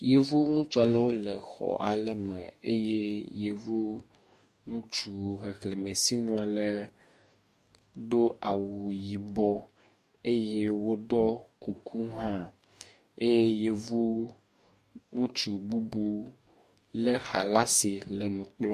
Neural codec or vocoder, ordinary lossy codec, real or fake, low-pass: codec, 44.1 kHz, 2.6 kbps, DAC; MP3, 64 kbps; fake; 14.4 kHz